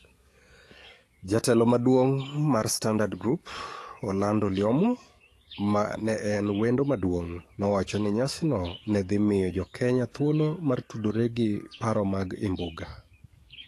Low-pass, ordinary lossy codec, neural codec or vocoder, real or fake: 14.4 kHz; AAC, 48 kbps; codec, 44.1 kHz, 7.8 kbps, DAC; fake